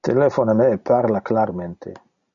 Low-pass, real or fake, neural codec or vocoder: 7.2 kHz; real; none